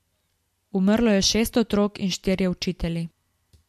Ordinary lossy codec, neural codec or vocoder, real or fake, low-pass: MP3, 64 kbps; none; real; 14.4 kHz